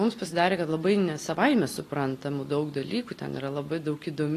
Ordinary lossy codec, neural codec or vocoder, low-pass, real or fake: AAC, 48 kbps; none; 14.4 kHz; real